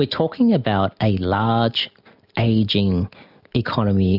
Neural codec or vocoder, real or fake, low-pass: none; real; 5.4 kHz